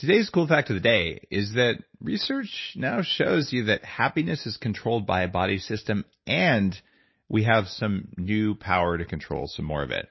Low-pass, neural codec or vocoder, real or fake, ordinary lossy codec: 7.2 kHz; none; real; MP3, 24 kbps